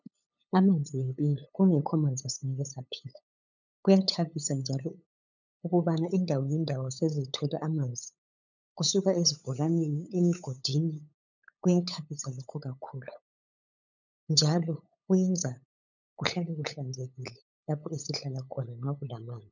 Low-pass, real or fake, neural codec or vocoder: 7.2 kHz; fake; codec, 16 kHz, 8 kbps, FunCodec, trained on LibriTTS, 25 frames a second